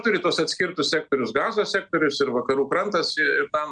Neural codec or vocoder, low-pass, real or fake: none; 9.9 kHz; real